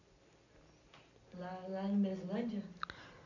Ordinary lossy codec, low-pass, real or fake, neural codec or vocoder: none; 7.2 kHz; real; none